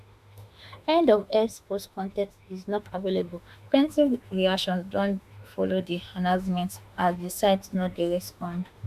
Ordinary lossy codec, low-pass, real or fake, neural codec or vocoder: MP3, 96 kbps; 14.4 kHz; fake; autoencoder, 48 kHz, 32 numbers a frame, DAC-VAE, trained on Japanese speech